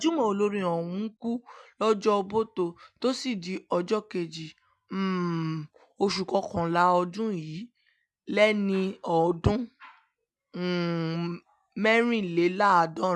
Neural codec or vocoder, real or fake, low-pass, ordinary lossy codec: none; real; none; none